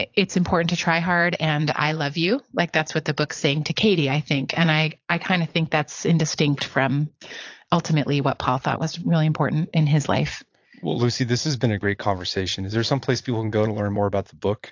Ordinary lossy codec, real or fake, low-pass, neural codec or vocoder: AAC, 48 kbps; fake; 7.2 kHz; vocoder, 22.05 kHz, 80 mel bands, Vocos